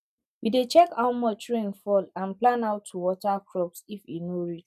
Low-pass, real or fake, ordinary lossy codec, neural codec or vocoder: 14.4 kHz; real; none; none